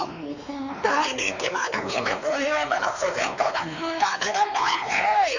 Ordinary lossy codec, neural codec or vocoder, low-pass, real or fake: none; codec, 16 kHz, 2 kbps, X-Codec, WavLM features, trained on Multilingual LibriSpeech; 7.2 kHz; fake